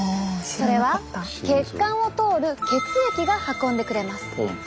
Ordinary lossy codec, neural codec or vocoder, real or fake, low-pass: none; none; real; none